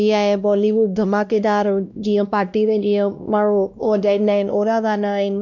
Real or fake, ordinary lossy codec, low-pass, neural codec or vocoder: fake; none; 7.2 kHz; codec, 16 kHz, 1 kbps, X-Codec, WavLM features, trained on Multilingual LibriSpeech